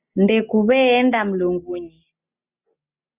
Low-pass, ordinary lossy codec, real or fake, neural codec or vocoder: 3.6 kHz; Opus, 64 kbps; real; none